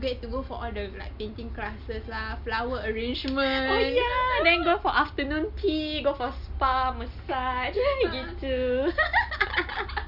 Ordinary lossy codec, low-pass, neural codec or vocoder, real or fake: none; 5.4 kHz; none; real